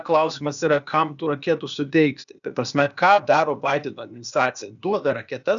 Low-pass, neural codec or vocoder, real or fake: 7.2 kHz; codec, 16 kHz, 0.8 kbps, ZipCodec; fake